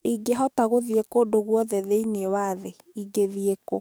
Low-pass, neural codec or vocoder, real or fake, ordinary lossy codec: none; codec, 44.1 kHz, 7.8 kbps, DAC; fake; none